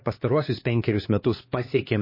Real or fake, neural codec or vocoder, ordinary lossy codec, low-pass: fake; codec, 16 kHz, 2 kbps, X-Codec, HuBERT features, trained on LibriSpeech; MP3, 24 kbps; 5.4 kHz